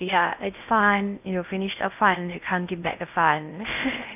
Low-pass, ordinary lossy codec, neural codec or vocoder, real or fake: 3.6 kHz; none; codec, 16 kHz in and 24 kHz out, 0.6 kbps, FocalCodec, streaming, 2048 codes; fake